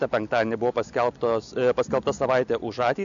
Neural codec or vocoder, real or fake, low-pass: none; real; 7.2 kHz